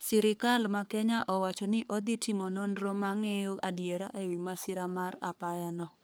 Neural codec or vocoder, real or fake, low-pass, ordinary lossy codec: codec, 44.1 kHz, 3.4 kbps, Pupu-Codec; fake; none; none